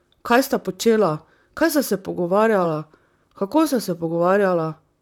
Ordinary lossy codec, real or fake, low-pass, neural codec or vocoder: none; fake; 19.8 kHz; vocoder, 44.1 kHz, 128 mel bands, Pupu-Vocoder